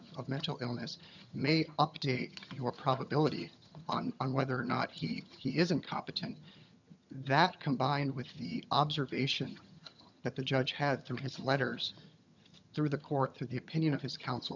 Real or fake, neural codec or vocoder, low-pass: fake; vocoder, 22.05 kHz, 80 mel bands, HiFi-GAN; 7.2 kHz